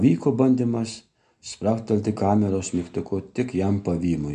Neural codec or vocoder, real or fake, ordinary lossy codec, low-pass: none; real; MP3, 64 kbps; 10.8 kHz